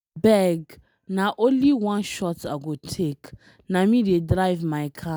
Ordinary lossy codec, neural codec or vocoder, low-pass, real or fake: none; none; none; real